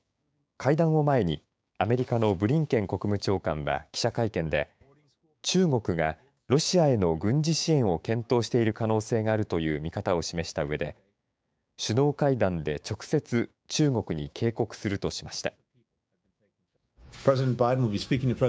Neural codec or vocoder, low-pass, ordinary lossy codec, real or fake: codec, 16 kHz, 6 kbps, DAC; none; none; fake